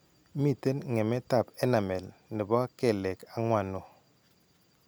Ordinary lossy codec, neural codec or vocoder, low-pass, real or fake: none; none; none; real